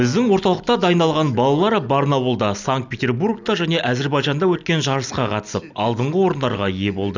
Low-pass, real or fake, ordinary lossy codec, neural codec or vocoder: 7.2 kHz; real; none; none